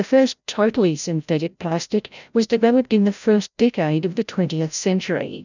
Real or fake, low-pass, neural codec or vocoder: fake; 7.2 kHz; codec, 16 kHz, 0.5 kbps, FreqCodec, larger model